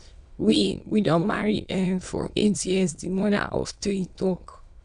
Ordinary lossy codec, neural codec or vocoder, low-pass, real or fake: none; autoencoder, 22.05 kHz, a latent of 192 numbers a frame, VITS, trained on many speakers; 9.9 kHz; fake